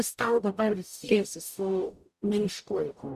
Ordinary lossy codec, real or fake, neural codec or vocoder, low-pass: Opus, 64 kbps; fake; codec, 44.1 kHz, 0.9 kbps, DAC; 14.4 kHz